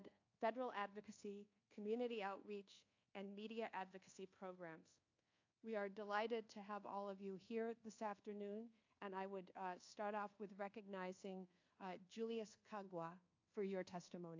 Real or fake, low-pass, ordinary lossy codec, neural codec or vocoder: fake; 7.2 kHz; AAC, 48 kbps; codec, 24 kHz, 1.2 kbps, DualCodec